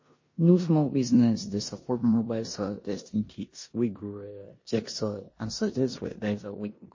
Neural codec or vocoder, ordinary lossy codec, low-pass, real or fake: codec, 16 kHz in and 24 kHz out, 0.9 kbps, LongCat-Audio-Codec, four codebook decoder; MP3, 32 kbps; 7.2 kHz; fake